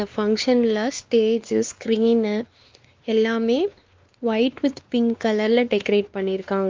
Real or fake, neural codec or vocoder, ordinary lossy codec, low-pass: fake; codec, 16 kHz, 2 kbps, X-Codec, WavLM features, trained on Multilingual LibriSpeech; Opus, 32 kbps; 7.2 kHz